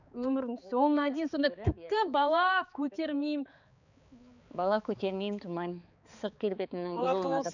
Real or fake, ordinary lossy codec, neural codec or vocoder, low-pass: fake; none; codec, 16 kHz, 4 kbps, X-Codec, HuBERT features, trained on balanced general audio; 7.2 kHz